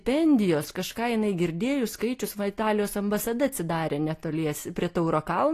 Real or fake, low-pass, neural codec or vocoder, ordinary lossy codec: real; 14.4 kHz; none; AAC, 48 kbps